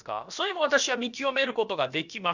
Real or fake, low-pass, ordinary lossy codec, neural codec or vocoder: fake; 7.2 kHz; none; codec, 16 kHz, about 1 kbps, DyCAST, with the encoder's durations